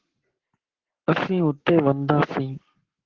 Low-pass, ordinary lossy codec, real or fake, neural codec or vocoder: 7.2 kHz; Opus, 16 kbps; fake; codec, 44.1 kHz, 7.8 kbps, Pupu-Codec